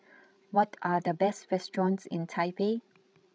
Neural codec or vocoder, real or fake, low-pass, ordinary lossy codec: codec, 16 kHz, 16 kbps, FreqCodec, larger model; fake; none; none